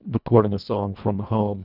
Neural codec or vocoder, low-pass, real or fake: codec, 24 kHz, 1.5 kbps, HILCodec; 5.4 kHz; fake